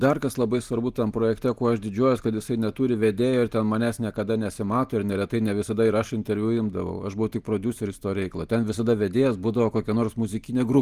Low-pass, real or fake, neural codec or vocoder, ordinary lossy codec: 14.4 kHz; real; none; Opus, 24 kbps